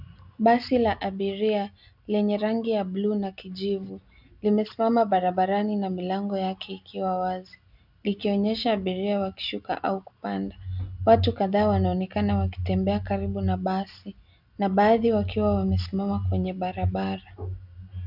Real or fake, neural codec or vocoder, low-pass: real; none; 5.4 kHz